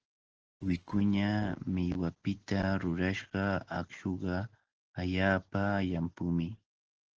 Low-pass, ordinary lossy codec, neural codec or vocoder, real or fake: 7.2 kHz; Opus, 16 kbps; none; real